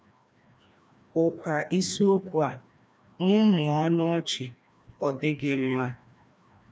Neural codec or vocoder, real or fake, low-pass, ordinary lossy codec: codec, 16 kHz, 1 kbps, FreqCodec, larger model; fake; none; none